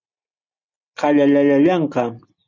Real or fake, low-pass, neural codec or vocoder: real; 7.2 kHz; none